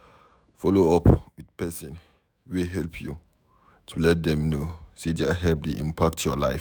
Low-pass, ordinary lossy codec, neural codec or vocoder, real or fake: none; none; autoencoder, 48 kHz, 128 numbers a frame, DAC-VAE, trained on Japanese speech; fake